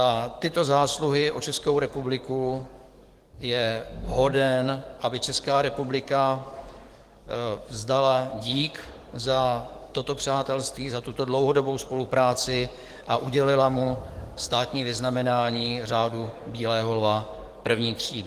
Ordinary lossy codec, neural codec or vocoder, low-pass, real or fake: Opus, 24 kbps; codec, 44.1 kHz, 7.8 kbps, DAC; 14.4 kHz; fake